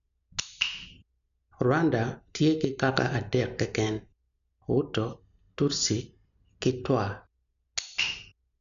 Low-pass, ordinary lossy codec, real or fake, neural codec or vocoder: 7.2 kHz; none; real; none